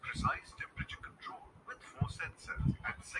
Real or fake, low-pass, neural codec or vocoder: real; 10.8 kHz; none